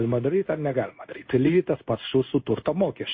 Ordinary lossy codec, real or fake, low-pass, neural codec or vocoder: MP3, 24 kbps; fake; 7.2 kHz; codec, 16 kHz in and 24 kHz out, 1 kbps, XY-Tokenizer